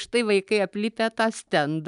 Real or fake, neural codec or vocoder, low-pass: real; none; 10.8 kHz